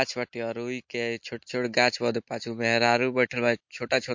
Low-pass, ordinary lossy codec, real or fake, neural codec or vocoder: 7.2 kHz; MP3, 48 kbps; real; none